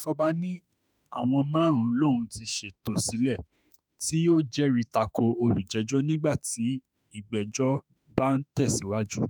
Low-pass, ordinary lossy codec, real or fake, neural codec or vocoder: none; none; fake; autoencoder, 48 kHz, 32 numbers a frame, DAC-VAE, trained on Japanese speech